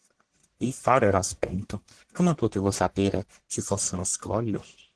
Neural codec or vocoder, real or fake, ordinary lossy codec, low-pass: codec, 44.1 kHz, 1.7 kbps, Pupu-Codec; fake; Opus, 16 kbps; 10.8 kHz